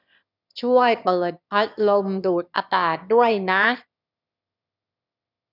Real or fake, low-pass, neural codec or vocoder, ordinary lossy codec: fake; 5.4 kHz; autoencoder, 22.05 kHz, a latent of 192 numbers a frame, VITS, trained on one speaker; none